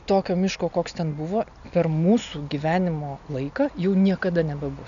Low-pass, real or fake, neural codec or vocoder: 7.2 kHz; real; none